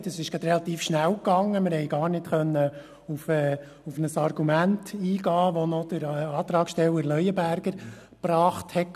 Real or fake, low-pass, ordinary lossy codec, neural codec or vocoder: real; 14.4 kHz; none; none